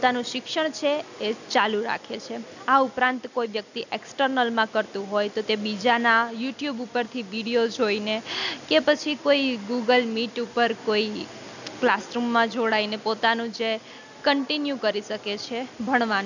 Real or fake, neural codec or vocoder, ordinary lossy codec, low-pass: real; none; none; 7.2 kHz